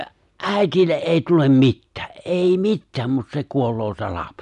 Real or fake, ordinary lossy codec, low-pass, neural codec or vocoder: real; none; 10.8 kHz; none